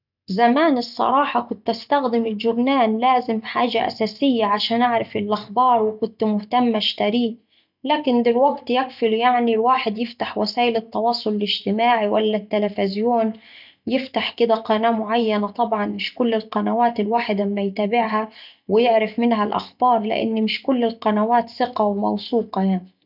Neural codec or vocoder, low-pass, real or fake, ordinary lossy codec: none; 5.4 kHz; real; none